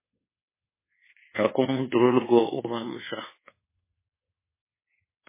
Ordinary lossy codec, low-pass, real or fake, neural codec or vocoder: MP3, 16 kbps; 3.6 kHz; fake; codec, 24 kHz, 1.2 kbps, DualCodec